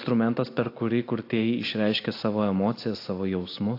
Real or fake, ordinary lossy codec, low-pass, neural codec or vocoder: real; AAC, 32 kbps; 5.4 kHz; none